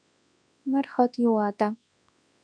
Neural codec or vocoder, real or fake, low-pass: codec, 24 kHz, 0.9 kbps, WavTokenizer, large speech release; fake; 9.9 kHz